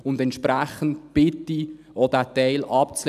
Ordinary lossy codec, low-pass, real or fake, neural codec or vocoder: none; 14.4 kHz; real; none